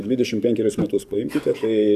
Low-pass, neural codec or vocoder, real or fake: 14.4 kHz; codec, 44.1 kHz, 7.8 kbps, DAC; fake